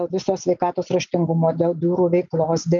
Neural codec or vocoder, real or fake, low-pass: none; real; 7.2 kHz